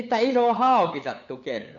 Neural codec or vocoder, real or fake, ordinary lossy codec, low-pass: codec, 16 kHz, 8 kbps, FunCodec, trained on LibriTTS, 25 frames a second; fake; none; 7.2 kHz